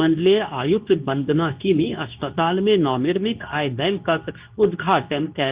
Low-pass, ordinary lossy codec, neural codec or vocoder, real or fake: 3.6 kHz; Opus, 32 kbps; codec, 24 kHz, 0.9 kbps, WavTokenizer, medium speech release version 2; fake